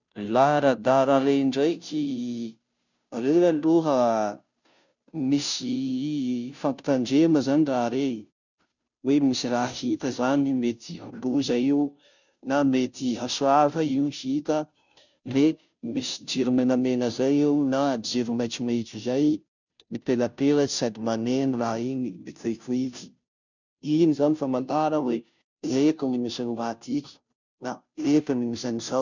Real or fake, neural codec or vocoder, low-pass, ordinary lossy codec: fake; codec, 16 kHz, 0.5 kbps, FunCodec, trained on Chinese and English, 25 frames a second; 7.2 kHz; none